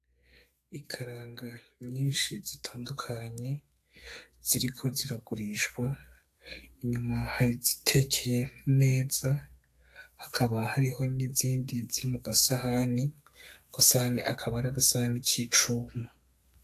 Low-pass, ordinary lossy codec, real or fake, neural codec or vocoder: 14.4 kHz; AAC, 64 kbps; fake; codec, 32 kHz, 1.9 kbps, SNAC